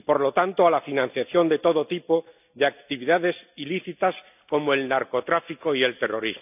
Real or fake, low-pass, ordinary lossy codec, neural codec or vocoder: real; 3.6 kHz; none; none